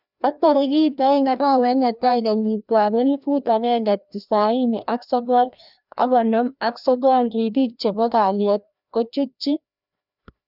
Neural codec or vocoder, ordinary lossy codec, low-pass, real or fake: codec, 16 kHz, 1 kbps, FreqCodec, larger model; none; 5.4 kHz; fake